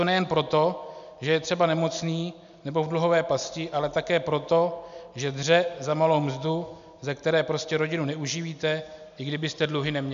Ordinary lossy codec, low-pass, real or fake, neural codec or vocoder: AAC, 96 kbps; 7.2 kHz; real; none